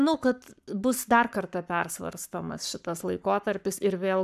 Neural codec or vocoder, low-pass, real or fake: codec, 44.1 kHz, 7.8 kbps, Pupu-Codec; 14.4 kHz; fake